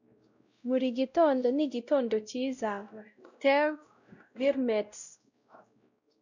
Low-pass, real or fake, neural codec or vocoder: 7.2 kHz; fake; codec, 16 kHz, 0.5 kbps, X-Codec, WavLM features, trained on Multilingual LibriSpeech